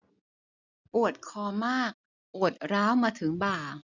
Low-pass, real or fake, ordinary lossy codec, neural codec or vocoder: 7.2 kHz; real; none; none